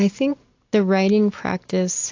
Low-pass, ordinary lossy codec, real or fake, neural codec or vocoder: 7.2 kHz; AAC, 48 kbps; real; none